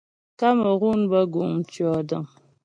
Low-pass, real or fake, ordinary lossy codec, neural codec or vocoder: 9.9 kHz; real; MP3, 64 kbps; none